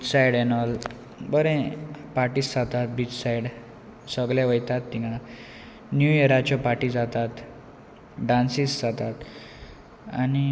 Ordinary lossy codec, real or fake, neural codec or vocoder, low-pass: none; real; none; none